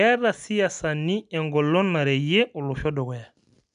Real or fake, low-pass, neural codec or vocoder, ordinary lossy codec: real; 9.9 kHz; none; none